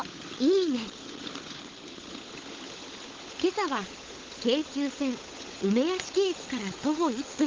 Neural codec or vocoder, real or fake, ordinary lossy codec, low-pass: codec, 16 kHz, 16 kbps, FunCodec, trained on LibriTTS, 50 frames a second; fake; Opus, 16 kbps; 7.2 kHz